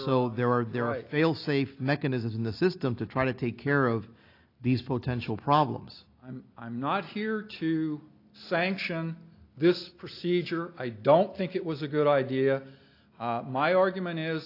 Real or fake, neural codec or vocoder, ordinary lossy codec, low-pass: real; none; AAC, 32 kbps; 5.4 kHz